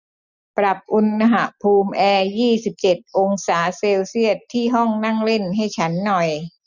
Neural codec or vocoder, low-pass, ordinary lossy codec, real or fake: none; 7.2 kHz; none; real